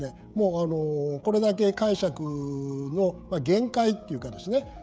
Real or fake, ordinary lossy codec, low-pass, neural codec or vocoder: fake; none; none; codec, 16 kHz, 16 kbps, FreqCodec, smaller model